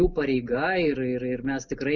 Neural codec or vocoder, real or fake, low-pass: none; real; 7.2 kHz